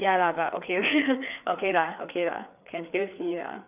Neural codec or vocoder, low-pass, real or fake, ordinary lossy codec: codec, 16 kHz, 4 kbps, FreqCodec, larger model; 3.6 kHz; fake; none